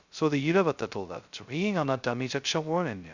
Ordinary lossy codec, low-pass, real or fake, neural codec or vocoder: Opus, 64 kbps; 7.2 kHz; fake; codec, 16 kHz, 0.2 kbps, FocalCodec